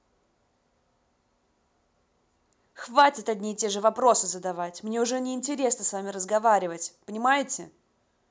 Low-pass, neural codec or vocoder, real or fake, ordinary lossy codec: none; none; real; none